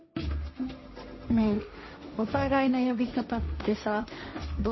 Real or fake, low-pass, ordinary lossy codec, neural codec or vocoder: fake; 7.2 kHz; MP3, 24 kbps; codec, 16 kHz, 1.1 kbps, Voila-Tokenizer